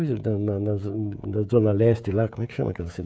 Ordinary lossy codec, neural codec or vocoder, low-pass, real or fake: none; codec, 16 kHz, 16 kbps, FreqCodec, smaller model; none; fake